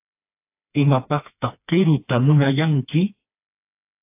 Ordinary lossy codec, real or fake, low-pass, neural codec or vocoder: AAC, 32 kbps; fake; 3.6 kHz; codec, 16 kHz, 2 kbps, FreqCodec, smaller model